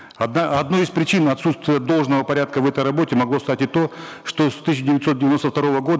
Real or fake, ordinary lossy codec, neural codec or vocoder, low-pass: real; none; none; none